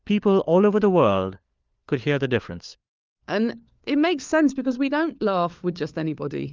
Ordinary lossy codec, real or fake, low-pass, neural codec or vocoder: Opus, 24 kbps; fake; 7.2 kHz; codec, 16 kHz, 4 kbps, FunCodec, trained on LibriTTS, 50 frames a second